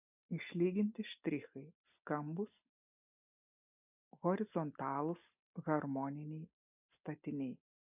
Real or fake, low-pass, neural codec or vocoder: real; 3.6 kHz; none